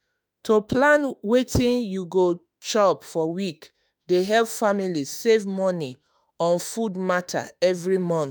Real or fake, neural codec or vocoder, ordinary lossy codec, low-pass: fake; autoencoder, 48 kHz, 32 numbers a frame, DAC-VAE, trained on Japanese speech; none; none